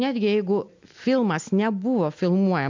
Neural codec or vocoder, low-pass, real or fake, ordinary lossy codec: none; 7.2 kHz; real; MP3, 64 kbps